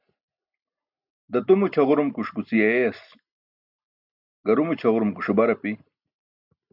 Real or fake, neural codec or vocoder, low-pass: real; none; 5.4 kHz